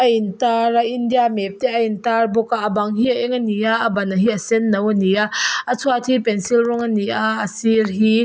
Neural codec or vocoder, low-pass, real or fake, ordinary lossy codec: none; none; real; none